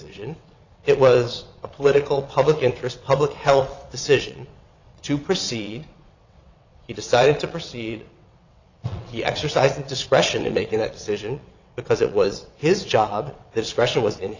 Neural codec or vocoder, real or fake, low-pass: vocoder, 22.05 kHz, 80 mel bands, WaveNeXt; fake; 7.2 kHz